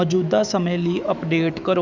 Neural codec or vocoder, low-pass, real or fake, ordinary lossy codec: autoencoder, 48 kHz, 128 numbers a frame, DAC-VAE, trained on Japanese speech; 7.2 kHz; fake; none